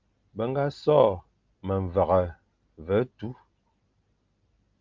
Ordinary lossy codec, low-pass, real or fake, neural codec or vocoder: Opus, 32 kbps; 7.2 kHz; real; none